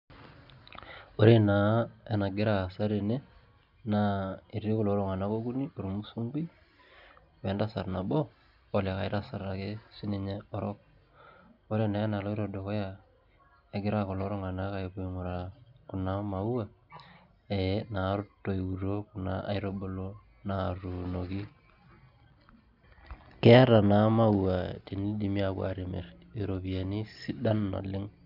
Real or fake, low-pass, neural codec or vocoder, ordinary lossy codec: real; 5.4 kHz; none; none